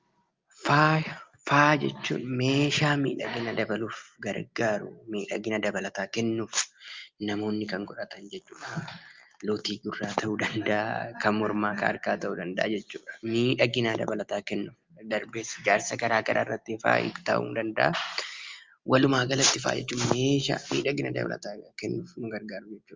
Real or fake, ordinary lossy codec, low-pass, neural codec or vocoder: real; Opus, 32 kbps; 7.2 kHz; none